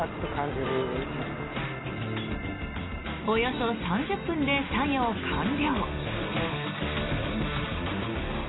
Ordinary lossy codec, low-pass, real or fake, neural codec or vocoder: AAC, 16 kbps; 7.2 kHz; real; none